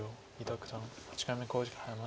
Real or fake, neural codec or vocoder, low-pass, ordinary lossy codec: real; none; none; none